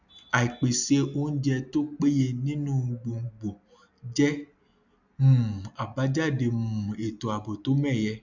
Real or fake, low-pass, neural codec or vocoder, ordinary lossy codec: real; 7.2 kHz; none; none